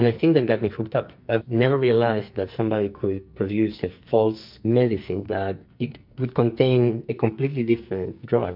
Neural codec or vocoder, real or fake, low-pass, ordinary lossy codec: codec, 44.1 kHz, 2.6 kbps, SNAC; fake; 5.4 kHz; MP3, 48 kbps